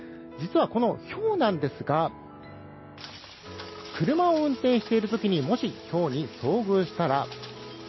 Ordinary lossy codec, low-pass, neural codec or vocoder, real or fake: MP3, 24 kbps; 7.2 kHz; none; real